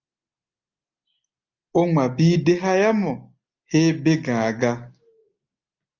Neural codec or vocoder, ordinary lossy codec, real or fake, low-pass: none; Opus, 32 kbps; real; 7.2 kHz